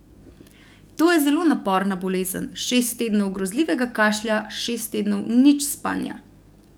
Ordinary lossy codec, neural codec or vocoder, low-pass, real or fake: none; codec, 44.1 kHz, 7.8 kbps, DAC; none; fake